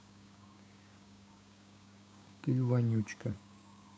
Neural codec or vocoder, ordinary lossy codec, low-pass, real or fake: codec, 16 kHz, 6 kbps, DAC; none; none; fake